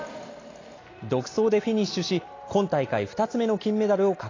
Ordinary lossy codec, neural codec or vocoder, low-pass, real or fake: AAC, 32 kbps; none; 7.2 kHz; real